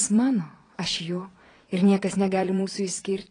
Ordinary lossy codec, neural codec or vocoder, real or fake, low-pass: AAC, 32 kbps; vocoder, 22.05 kHz, 80 mel bands, Vocos; fake; 9.9 kHz